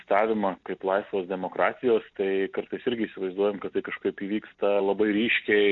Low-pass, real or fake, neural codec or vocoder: 7.2 kHz; real; none